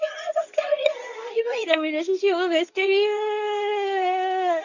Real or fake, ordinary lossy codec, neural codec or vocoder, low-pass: fake; none; codec, 24 kHz, 0.9 kbps, WavTokenizer, medium speech release version 2; 7.2 kHz